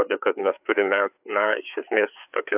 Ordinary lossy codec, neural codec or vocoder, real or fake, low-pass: AAC, 32 kbps; codec, 16 kHz, 4 kbps, FreqCodec, larger model; fake; 3.6 kHz